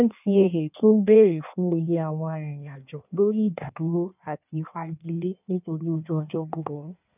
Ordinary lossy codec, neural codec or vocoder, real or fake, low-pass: none; codec, 16 kHz in and 24 kHz out, 1.1 kbps, FireRedTTS-2 codec; fake; 3.6 kHz